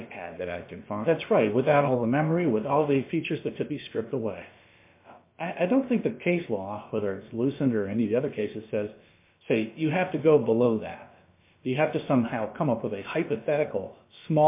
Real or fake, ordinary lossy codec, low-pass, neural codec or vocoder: fake; MP3, 24 kbps; 3.6 kHz; codec, 16 kHz, about 1 kbps, DyCAST, with the encoder's durations